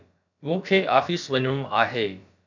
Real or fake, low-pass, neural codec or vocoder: fake; 7.2 kHz; codec, 16 kHz, about 1 kbps, DyCAST, with the encoder's durations